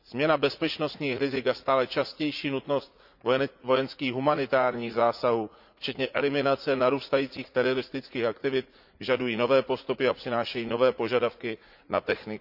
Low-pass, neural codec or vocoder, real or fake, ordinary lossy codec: 5.4 kHz; vocoder, 44.1 kHz, 80 mel bands, Vocos; fake; none